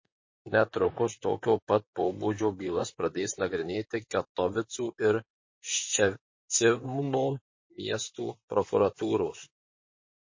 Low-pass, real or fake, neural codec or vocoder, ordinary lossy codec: 7.2 kHz; fake; vocoder, 44.1 kHz, 128 mel bands, Pupu-Vocoder; MP3, 32 kbps